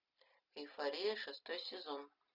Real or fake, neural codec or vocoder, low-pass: real; none; 5.4 kHz